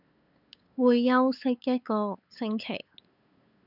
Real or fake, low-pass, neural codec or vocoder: fake; 5.4 kHz; codec, 16 kHz, 8 kbps, FunCodec, trained on LibriTTS, 25 frames a second